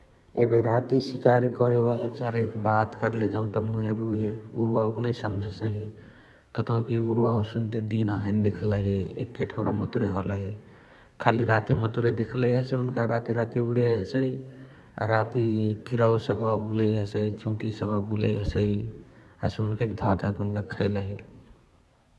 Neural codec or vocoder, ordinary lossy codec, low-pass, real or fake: codec, 24 kHz, 1 kbps, SNAC; none; none; fake